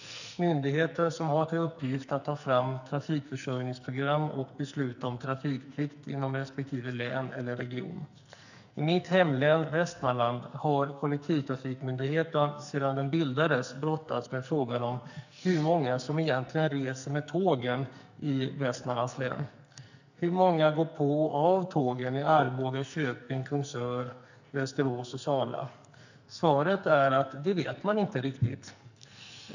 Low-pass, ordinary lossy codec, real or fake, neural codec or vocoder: 7.2 kHz; none; fake; codec, 44.1 kHz, 2.6 kbps, SNAC